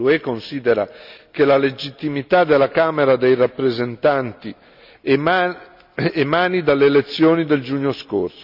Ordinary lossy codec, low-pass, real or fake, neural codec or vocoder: none; 5.4 kHz; real; none